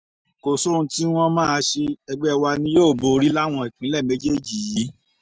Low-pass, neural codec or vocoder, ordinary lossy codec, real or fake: none; none; none; real